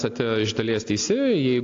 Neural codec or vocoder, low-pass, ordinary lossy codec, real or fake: none; 7.2 kHz; AAC, 48 kbps; real